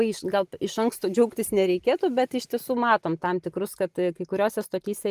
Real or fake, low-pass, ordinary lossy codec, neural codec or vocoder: real; 14.4 kHz; Opus, 32 kbps; none